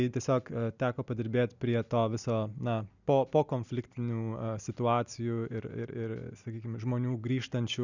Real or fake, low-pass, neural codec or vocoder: real; 7.2 kHz; none